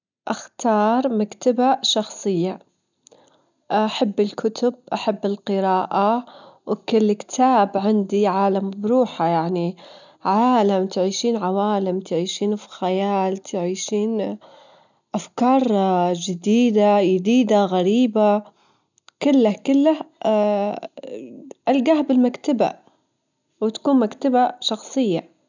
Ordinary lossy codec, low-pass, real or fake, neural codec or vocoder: none; 7.2 kHz; real; none